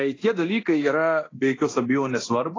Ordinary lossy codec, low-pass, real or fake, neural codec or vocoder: AAC, 32 kbps; 7.2 kHz; fake; codec, 24 kHz, 0.9 kbps, DualCodec